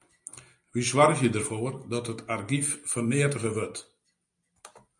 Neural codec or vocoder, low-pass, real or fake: none; 10.8 kHz; real